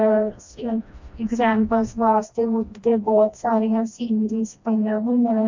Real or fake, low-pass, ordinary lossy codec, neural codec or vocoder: fake; 7.2 kHz; MP3, 48 kbps; codec, 16 kHz, 1 kbps, FreqCodec, smaller model